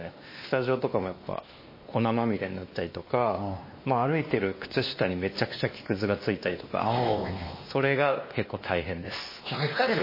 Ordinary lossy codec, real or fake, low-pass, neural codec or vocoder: MP3, 24 kbps; fake; 5.4 kHz; codec, 16 kHz, 2 kbps, X-Codec, WavLM features, trained on Multilingual LibriSpeech